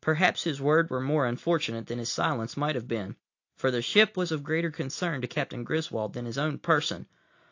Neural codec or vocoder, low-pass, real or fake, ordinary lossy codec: none; 7.2 kHz; real; AAC, 48 kbps